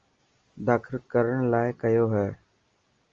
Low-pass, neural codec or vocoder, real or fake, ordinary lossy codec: 7.2 kHz; none; real; Opus, 32 kbps